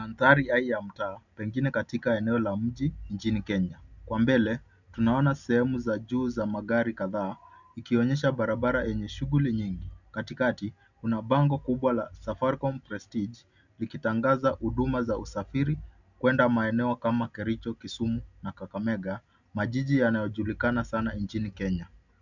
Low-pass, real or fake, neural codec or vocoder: 7.2 kHz; real; none